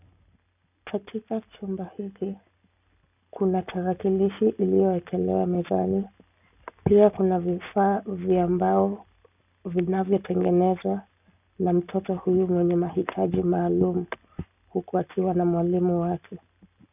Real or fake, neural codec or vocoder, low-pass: real; none; 3.6 kHz